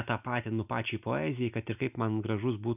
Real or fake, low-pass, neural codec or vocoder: real; 3.6 kHz; none